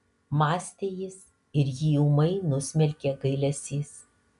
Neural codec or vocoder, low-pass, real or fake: none; 10.8 kHz; real